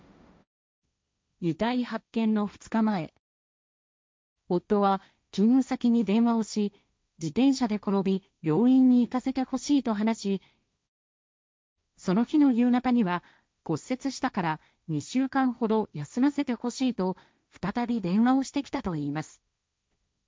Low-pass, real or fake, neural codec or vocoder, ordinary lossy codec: none; fake; codec, 16 kHz, 1.1 kbps, Voila-Tokenizer; none